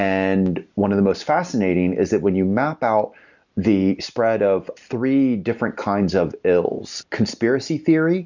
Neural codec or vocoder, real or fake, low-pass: none; real; 7.2 kHz